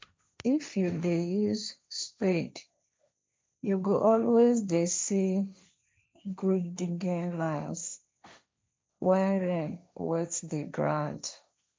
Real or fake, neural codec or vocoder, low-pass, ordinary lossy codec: fake; codec, 16 kHz, 1.1 kbps, Voila-Tokenizer; none; none